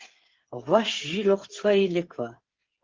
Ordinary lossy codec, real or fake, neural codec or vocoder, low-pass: Opus, 16 kbps; fake; codec, 16 kHz, 8 kbps, FreqCodec, smaller model; 7.2 kHz